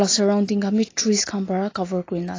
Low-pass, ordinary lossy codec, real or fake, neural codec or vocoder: 7.2 kHz; AAC, 32 kbps; real; none